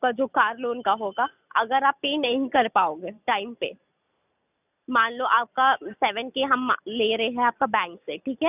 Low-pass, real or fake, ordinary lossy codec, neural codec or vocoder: 3.6 kHz; real; none; none